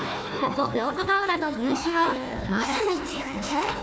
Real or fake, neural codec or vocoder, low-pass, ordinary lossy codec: fake; codec, 16 kHz, 1 kbps, FunCodec, trained on Chinese and English, 50 frames a second; none; none